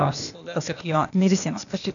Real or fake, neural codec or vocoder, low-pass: fake; codec, 16 kHz, 0.8 kbps, ZipCodec; 7.2 kHz